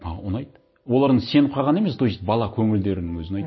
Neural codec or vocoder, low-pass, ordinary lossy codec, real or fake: none; 7.2 kHz; MP3, 24 kbps; real